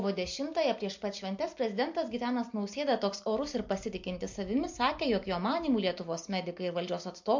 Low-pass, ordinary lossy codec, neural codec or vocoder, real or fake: 7.2 kHz; MP3, 48 kbps; none; real